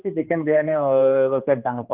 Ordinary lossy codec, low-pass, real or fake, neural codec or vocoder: Opus, 32 kbps; 3.6 kHz; fake; codec, 16 kHz, 2 kbps, X-Codec, HuBERT features, trained on general audio